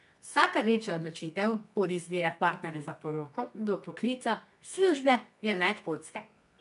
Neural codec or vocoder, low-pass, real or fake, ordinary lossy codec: codec, 24 kHz, 0.9 kbps, WavTokenizer, medium music audio release; 10.8 kHz; fake; none